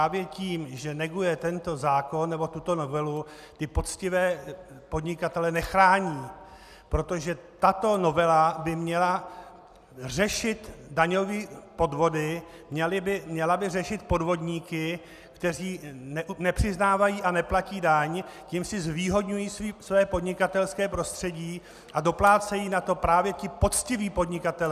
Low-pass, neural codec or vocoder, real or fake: 14.4 kHz; none; real